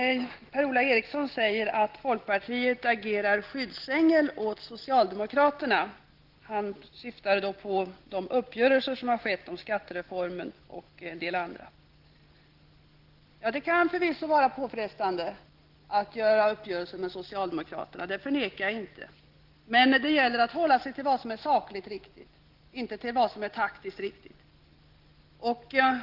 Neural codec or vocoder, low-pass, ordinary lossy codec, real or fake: none; 5.4 kHz; Opus, 16 kbps; real